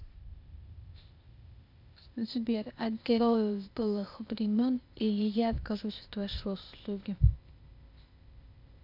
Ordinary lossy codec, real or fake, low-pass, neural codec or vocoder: none; fake; 5.4 kHz; codec, 16 kHz, 0.8 kbps, ZipCodec